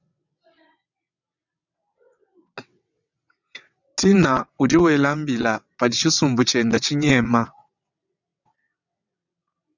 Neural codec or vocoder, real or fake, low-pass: vocoder, 22.05 kHz, 80 mel bands, WaveNeXt; fake; 7.2 kHz